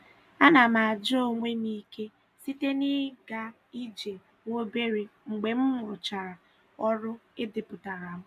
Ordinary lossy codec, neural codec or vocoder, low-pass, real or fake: none; none; 14.4 kHz; real